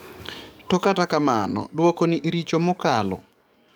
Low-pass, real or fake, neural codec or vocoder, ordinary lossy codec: none; fake; codec, 44.1 kHz, 7.8 kbps, DAC; none